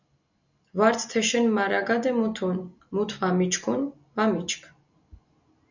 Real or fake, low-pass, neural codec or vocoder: real; 7.2 kHz; none